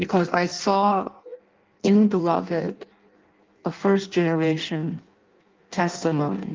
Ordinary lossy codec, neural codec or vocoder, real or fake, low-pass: Opus, 32 kbps; codec, 16 kHz in and 24 kHz out, 0.6 kbps, FireRedTTS-2 codec; fake; 7.2 kHz